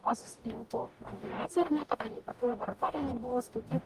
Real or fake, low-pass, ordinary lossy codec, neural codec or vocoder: fake; 14.4 kHz; Opus, 32 kbps; codec, 44.1 kHz, 0.9 kbps, DAC